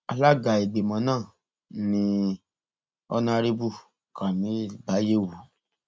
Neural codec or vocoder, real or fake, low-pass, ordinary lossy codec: none; real; none; none